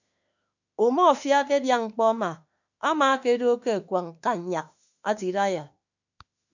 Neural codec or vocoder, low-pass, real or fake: autoencoder, 48 kHz, 32 numbers a frame, DAC-VAE, trained on Japanese speech; 7.2 kHz; fake